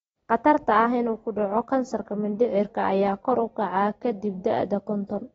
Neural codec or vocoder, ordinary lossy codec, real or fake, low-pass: none; AAC, 24 kbps; real; 19.8 kHz